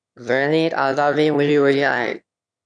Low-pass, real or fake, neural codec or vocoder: 9.9 kHz; fake; autoencoder, 22.05 kHz, a latent of 192 numbers a frame, VITS, trained on one speaker